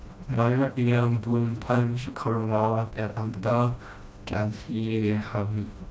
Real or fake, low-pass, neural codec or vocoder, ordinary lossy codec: fake; none; codec, 16 kHz, 1 kbps, FreqCodec, smaller model; none